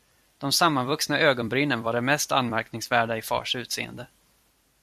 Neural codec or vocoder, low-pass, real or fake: vocoder, 44.1 kHz, 128 mel bands every 256 samples, BigVGAN v2; 14.4 kHz; fake